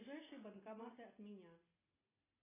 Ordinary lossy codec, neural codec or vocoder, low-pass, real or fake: AAC, 16 kbps; none; 3.6 kHz; real